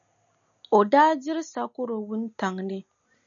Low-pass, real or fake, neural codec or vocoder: 7.2 kHz; real; none